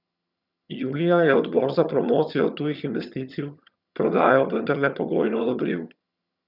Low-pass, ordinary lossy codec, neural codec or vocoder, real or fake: 5.4 kHz; none; vocoder, 22.05 kHz, 80 mel bands, HiFi-GAN; fake